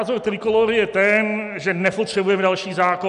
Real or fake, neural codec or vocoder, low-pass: real; none; 10.8 kHz